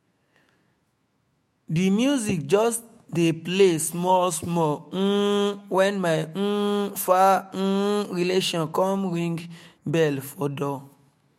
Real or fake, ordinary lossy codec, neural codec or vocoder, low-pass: fake; MP3, 64 kbps; autoencoder, 48 kHz, 128 numbers a frame, DAC-VAE, trained on Japanese speech; 19.8 kHz